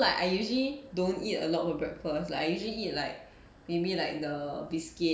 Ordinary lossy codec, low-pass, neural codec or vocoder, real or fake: none; none; none; real